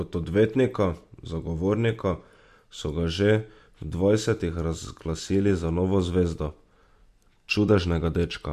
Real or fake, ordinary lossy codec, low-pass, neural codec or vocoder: real; MP3, 64 kbps; 14.4 kHz; none